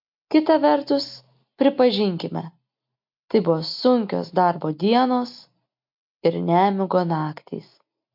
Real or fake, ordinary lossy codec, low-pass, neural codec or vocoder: real; MP3, 48 kbps; 5.4 kHz; none